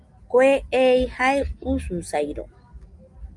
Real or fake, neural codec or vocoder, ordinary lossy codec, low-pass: real; none; Opus, 32 kbps; 10.8 kHz